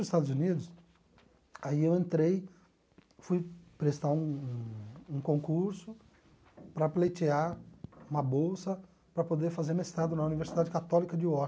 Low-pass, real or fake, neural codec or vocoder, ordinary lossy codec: none; real; none; none